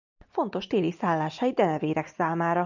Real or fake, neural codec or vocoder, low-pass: real; none; 7.2 kHz